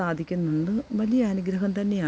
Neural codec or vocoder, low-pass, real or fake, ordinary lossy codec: none; none; real; none